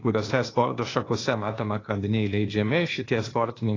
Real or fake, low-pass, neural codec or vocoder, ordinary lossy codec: fake; 7.2 kHz; codec, 16 kHz, 0.8 kbps, ZipCodec; AAC, 32 kbps